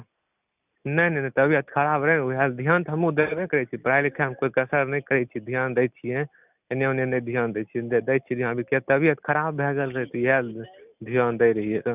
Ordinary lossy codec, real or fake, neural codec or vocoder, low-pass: none; real; none; 3.6 kHz